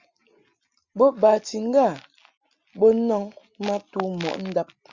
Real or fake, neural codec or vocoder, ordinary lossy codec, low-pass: real; none; Opus, 64 kbps; 7.2 kHz